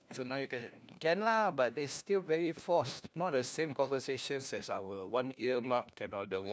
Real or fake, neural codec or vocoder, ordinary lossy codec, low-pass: fake; codec, 16 kHz, 1 kbps, FunCodec, trained on LibriTTS, 50 frames a second; none; none